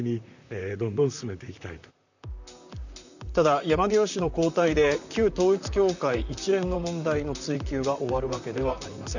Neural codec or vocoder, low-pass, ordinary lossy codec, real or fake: vocoder, 44.1 kHz, 128 mel bands, Pupu-Vocoder; 7.2 kHz; none; fake